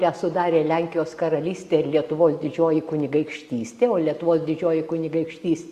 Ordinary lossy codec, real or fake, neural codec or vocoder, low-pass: Opus, 64 kbps; real; none; 14.4 kHz